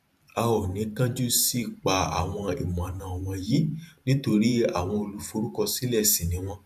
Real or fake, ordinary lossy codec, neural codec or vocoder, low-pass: fake; none; vocoder, 44.1 kHz, 128 mel bands every 512 samples, BigVGAN v2; 14.4 kHz